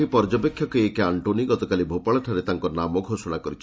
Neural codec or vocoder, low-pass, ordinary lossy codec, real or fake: none; none; none; real